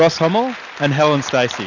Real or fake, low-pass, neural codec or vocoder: real; 7.2 kHz; none